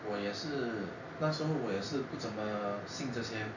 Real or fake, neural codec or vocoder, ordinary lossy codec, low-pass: real; none; none; 7.2 kHz